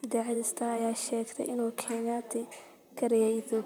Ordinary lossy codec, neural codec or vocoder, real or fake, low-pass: none; vocoder, 44.1 kHz, 128 mel bands, Pupu-Vocoder; fake; none